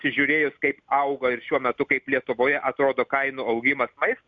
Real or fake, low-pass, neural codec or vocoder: real; 7.2 kHz; none